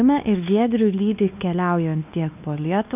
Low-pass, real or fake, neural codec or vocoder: 3.6 kHz; fake; codec, 16 kHz, 2 kbps, X-Codec, WavLM features, trained on Multilingual LibriSpeech